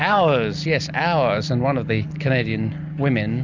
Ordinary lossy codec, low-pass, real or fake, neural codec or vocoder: MP3, 64 kbps; 7.2 kHz; real; none